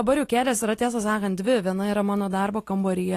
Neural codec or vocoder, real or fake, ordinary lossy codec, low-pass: none; real; AAC, 48 kbps; 14.4 kHz